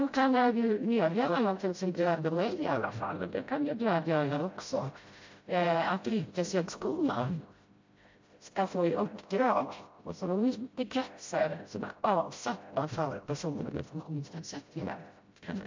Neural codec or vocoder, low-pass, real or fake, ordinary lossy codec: codec, 16 kHz, 0.5 kbps, FreqCodec, smaller model; 7.2 kHz; fake; MP3, 48 kbps